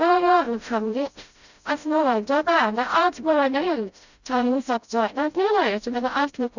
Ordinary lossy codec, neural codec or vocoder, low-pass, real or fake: none; codec, 16 kHz, 0.5 kbps, FreqCodec, smaller model; 7.2 kHz; fake